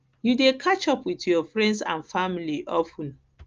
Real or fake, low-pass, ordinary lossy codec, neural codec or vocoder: real; 7.2 kHz; Opus, 24 kbps; none